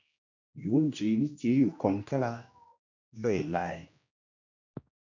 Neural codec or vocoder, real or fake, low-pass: codec, 16 kHz, 1 kbps, X-Codec, HuBERT features, trained on general audio; fake; 7.2 kHz